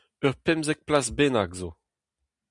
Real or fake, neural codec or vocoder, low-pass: real; none; 10.8 kHz